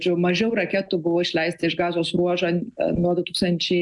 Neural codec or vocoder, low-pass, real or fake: none; 10.8 kHz; real